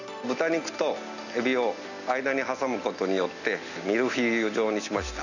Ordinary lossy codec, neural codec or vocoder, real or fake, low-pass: none; none; real; 7.2 kHz